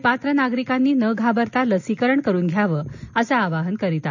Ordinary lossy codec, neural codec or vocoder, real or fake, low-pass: none; none; real; 7.2 kHz